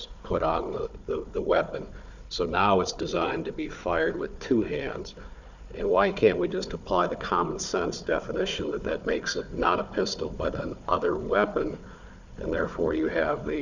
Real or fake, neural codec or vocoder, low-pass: fake; codec, 16 kHz, 4 kbps, FunCodec, trained on Chinese and English, 50 frames a second; 7.2 kHz